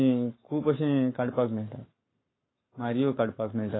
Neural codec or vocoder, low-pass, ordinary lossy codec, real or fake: codec, 44.1 kHz, 7.8 kbps, Pupu-Codec; 7.2 kHz; AAC, 16 kbps; fake